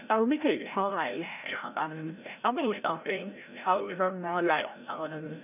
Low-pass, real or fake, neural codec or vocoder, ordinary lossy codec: 3.6 kHz; fake; codec, 16 kHz, 0.5 kbps, FreqCodec, larger model; none